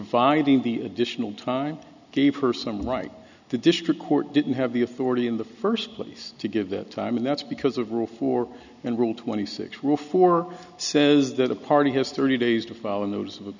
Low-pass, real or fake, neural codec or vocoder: 7.2 kHz; real; none